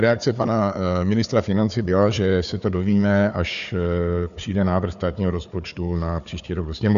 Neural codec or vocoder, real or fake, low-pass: codec, 16 kHz, 4 kbps, FunCodec, trained on Chinese and English, 50 frames a second; fake; 7.2 kHz